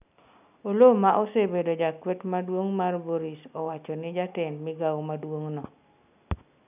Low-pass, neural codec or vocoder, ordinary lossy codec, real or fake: 3.6 kHz; none; none; real